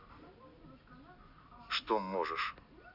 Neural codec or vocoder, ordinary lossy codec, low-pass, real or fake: none; Opus, 64 kbps; 5.4 kHz; real